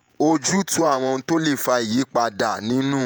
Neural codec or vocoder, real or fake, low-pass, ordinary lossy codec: vocoder, 48 kHz, 128 mel bands, Vocos; fake; none; none